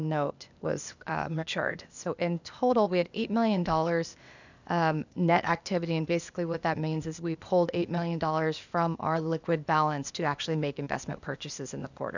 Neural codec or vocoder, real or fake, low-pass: codec, 16 kHz, 0.8 kbps, ZipCodec; fake; 7.2 kHz